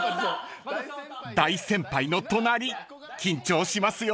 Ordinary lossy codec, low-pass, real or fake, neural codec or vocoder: none; none; real; none